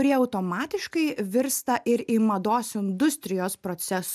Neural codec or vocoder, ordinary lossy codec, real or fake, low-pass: none; MP3, 96 kbps; real; 14.4 kHz